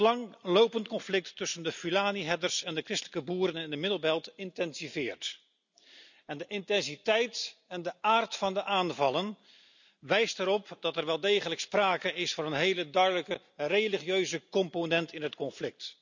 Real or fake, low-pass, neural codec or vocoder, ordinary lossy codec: real; 7.2 kHz; none; none